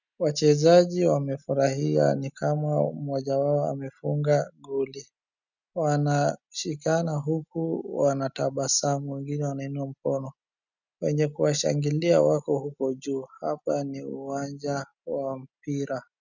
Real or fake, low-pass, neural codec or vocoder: real; 7.2 kHz; none